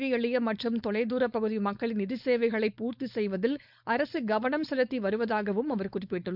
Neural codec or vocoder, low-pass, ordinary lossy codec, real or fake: codec, 16 kHz, 4.8 kbps, FACodec; 5.4 kHz; none; fake